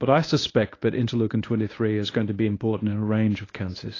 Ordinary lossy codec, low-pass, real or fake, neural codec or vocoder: AAC, 32 kbps; 7.2 kHz; fake; codec, 24 kHz, 0.9 kbps, WavTokenizer, medium speech release version 1